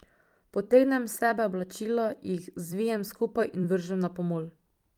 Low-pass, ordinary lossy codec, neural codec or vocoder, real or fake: 19.8 kHz; Opus, 32 kbps; vocoder, 44.1 kHz, 128 mel bands every 256 samples, BigVGAN v2; fake